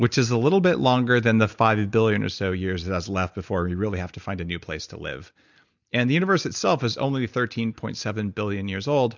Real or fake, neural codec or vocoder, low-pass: real; none; 7.2 kHz